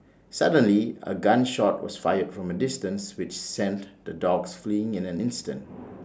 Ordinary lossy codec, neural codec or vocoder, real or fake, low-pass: none; none; real; none